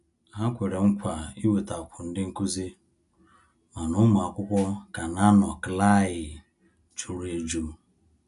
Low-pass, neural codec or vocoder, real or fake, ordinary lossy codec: 10.8 kHz; none; real; none